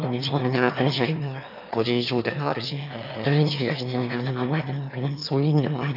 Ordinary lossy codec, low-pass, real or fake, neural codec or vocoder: none; 5.4 kHz; fake; autoencoder, 22.05 kHz, a latent of 192 numbers a frame, VITS, trained on one speaker